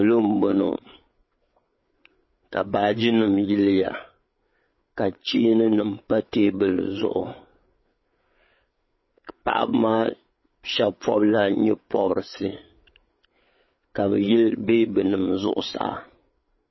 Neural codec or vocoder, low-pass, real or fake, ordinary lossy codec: codec, 16 kHz, 8 kbps, FreqCodec, larger model; 7.2 kHz; fake; MP3, 24 kbps